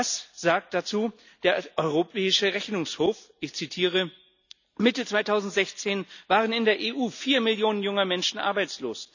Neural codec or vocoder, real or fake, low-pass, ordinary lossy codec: none; real; 7.2 kHz; none